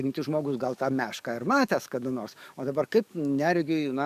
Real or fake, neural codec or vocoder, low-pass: fake; codec, 44.1 kHz, 7.8 kbps, DAC; 14.4 kHz